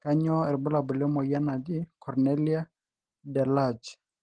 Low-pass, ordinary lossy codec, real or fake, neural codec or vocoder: 9.9 kHz; Opus, 16 kbps; real; none